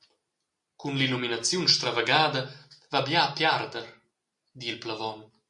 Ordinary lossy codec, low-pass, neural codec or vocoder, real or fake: MP3, 48 kbps; 10.8 kHz; none; real